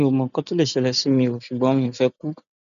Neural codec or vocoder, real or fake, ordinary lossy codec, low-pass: none; real; none; 7.2 kHz